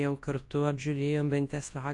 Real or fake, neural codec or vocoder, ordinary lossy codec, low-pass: fake; codec, 24 kHz, 0.9 kbps, WavTokenizer, large speech release; AAC, 48 kbps; 10.8 kHz